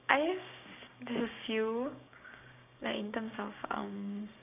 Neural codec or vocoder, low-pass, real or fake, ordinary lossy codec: codec, 16 kHz, 6 kbps, DAC; 3.6 kHz; fake; none